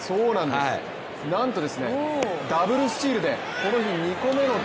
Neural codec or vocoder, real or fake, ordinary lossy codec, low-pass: none; real; none; none